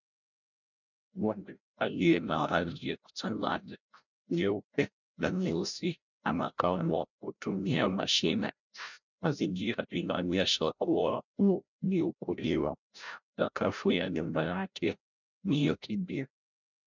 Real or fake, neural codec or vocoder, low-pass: fake; codec, 16 kHz, 0.5 kbps, FreqCodec, larger model; 7.2 kHz